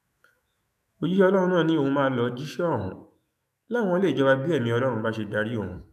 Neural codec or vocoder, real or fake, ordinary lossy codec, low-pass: autoencoder, 48 kHz, 128 numbers a frame, DAC-VAE, trained on Japanese speech; fake; none; 14.4 kHz